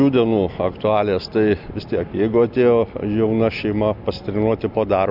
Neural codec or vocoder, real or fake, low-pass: none; real; 5.4 kHz